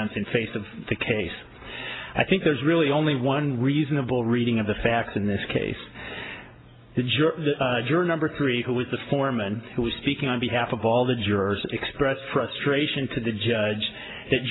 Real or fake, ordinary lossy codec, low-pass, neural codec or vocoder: real; AAC, 16 kbps; 7.2 kHz; none